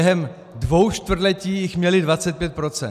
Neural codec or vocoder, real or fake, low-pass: none; real; 14.4 kHz